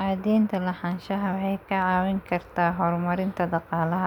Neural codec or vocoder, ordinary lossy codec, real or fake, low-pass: none; none; real; 19.8 kHz